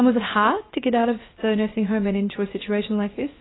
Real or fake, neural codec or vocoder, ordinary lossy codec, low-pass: fake; codec, 16 kHz, about 1 kbps, DyCAST, with the encoder's durations; AAC, 16 kbps; 7.2 kHz